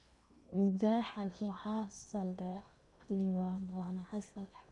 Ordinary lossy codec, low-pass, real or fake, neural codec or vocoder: none; 10.8 kHz; fake; codec, 16 kHz in and 24 kHz out, 0.8 kbps, FocalCodec, streaming, 65536 codes